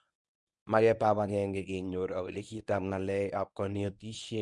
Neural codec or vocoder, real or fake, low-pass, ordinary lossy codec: codec, 24 kHz, 0.9 kbps, WavTokenizer, medium speech release version 1; fake; 10.8 kHz; none